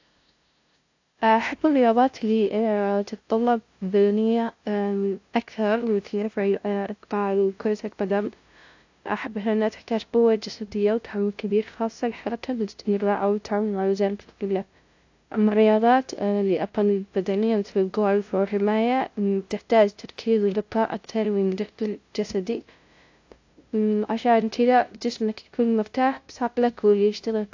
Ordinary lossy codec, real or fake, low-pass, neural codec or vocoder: AAC, 48 kbps; fake; 7.2 kHz; codec, 16 kHz, 0.5 kbps, FunCodec, trained on LibriTTS, 25 frames a second